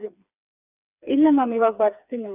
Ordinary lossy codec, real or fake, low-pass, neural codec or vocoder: none; fake; 3.6 kHz; codec, 16 kHz, 4 kbps, FreqCodec, smaller model